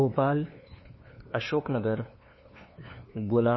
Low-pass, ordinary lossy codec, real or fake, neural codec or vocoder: 7.2 kHz; MP3, 24 kbps; fake; codec, 16 kHz, 2 kbps, FunCodec, trained on LibriTTS, 25 frames a second